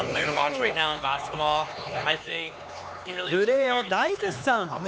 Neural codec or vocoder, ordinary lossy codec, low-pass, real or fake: codec, 16 kHz, 4 kbps, X-Codec, HuBERT features, trained on LibriSpeech; none; none; fake